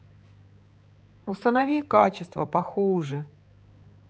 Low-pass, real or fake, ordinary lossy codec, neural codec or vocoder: none; fake; none; codec, 16 kHz, 4 kbps, X-Codec, HuBERT features, trained on balanced general audio